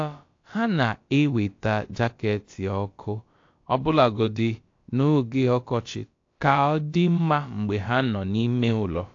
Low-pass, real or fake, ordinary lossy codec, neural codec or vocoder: 7.2 kHz; fake; AAC, 48 kbps; codec, 16 kHz, about 1 kbps, DyCAST, with the encoder's durations